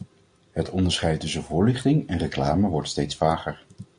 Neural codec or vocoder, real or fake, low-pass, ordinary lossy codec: none; real; 9.9 kHz; MP3, 48 kbps